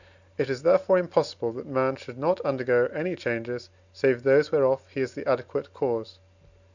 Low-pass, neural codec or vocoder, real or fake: 7.2 kHz; none; real